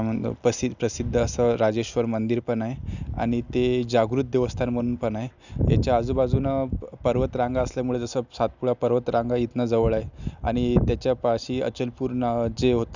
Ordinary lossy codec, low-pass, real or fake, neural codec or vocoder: none; 7.2 kHz; real; none